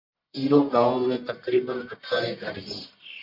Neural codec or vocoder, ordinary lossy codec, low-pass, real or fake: codec, 44.1 kHz, 1.7 kbps, Pupu-Codec; MP3, 48 kbps; 5.4 kHz; fake